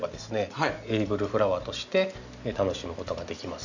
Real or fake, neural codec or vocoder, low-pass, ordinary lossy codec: real; none; 7.2 kHz; none